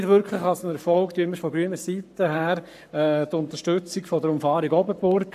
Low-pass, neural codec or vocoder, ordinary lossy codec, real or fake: 14.4 kHz; codec, 44.1 kHz, 7.8 kbps, Pupu-Codec; AAC, 96 kbps; fake